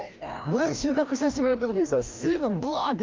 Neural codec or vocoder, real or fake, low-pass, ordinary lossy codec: codec, 16 kHz, 1 kbps, FreqCodec, larger model; fake; 7.2 kHz; Opus, 24 kbps